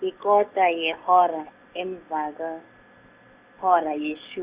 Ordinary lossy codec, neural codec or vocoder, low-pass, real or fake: Opus, 64 kbps; none; 3.6 kHz; real